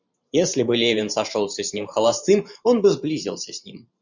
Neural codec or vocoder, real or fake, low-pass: vocoder, 44.1 kHz, 128 mel bands every 512 samples, BigVGAN v2; fake; 7.2 kHz